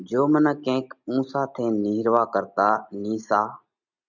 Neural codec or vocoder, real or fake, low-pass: none; real; 7.2 kHz